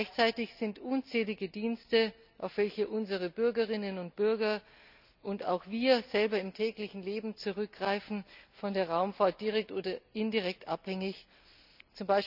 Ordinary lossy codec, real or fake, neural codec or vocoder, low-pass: none; real; none; 5.4 kHz